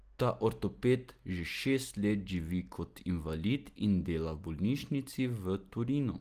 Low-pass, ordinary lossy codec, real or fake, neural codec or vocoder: 14.4 kHz; Opus, 24 kbps; real; none